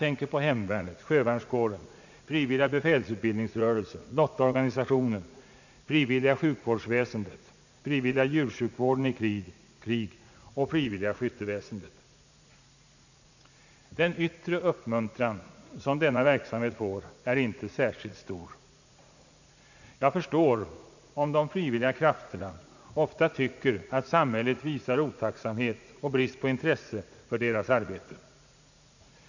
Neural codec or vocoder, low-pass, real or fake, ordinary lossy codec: vocoder, 44.1 kHz, 80 mel bands, Vocos; 7.2 kHz; fake; none